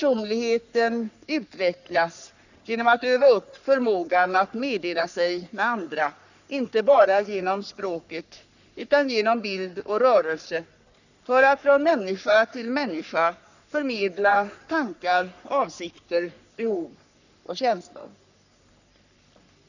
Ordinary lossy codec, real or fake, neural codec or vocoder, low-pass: none; fake; codec, 44.1 kHz, 3.4 kbps, Pupu-Codec; 7.2 kHz